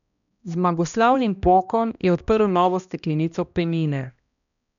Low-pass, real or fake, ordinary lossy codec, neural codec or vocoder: 7.2 kHz; fake; none; codec, 16 kHz, 1 kbps, X-Codec, HuBERT features, trained on balanced general audio